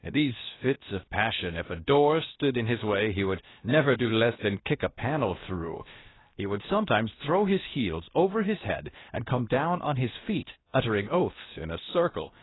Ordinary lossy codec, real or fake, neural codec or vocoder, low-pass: AAC, 16 kbps; fake; codec, 16 kHz, 0.7 kbps, FocalCodec; 7.2 kHz